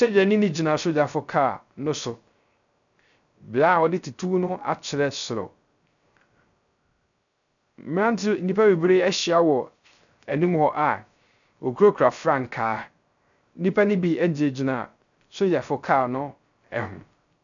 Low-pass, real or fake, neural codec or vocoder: 7.2 kHz; fake; codec, 16 kHz, 0.3 kbps, FocalCodec